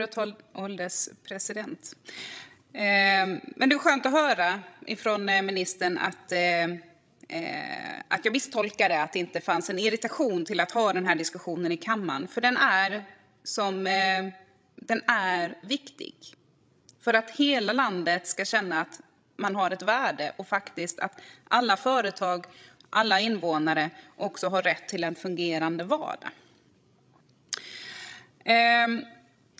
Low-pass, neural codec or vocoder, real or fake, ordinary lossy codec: none; codec, 16 kHz, 16 kbps, FreqCodec, larger model; fake; none